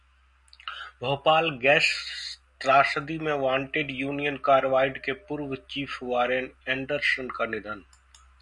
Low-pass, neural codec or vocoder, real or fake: 10.8 kHz; none; real